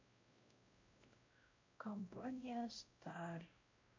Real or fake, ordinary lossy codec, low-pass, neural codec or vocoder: fake; MP3, 48 kbps; 7.2 kHz; codec, 16 kHz, 0.5 kbps, X-Codec, WavLM features, trained on Multilingual LibriSpeech